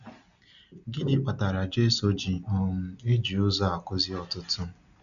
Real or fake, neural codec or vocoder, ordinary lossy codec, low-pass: real; none; none; 7.2 kHz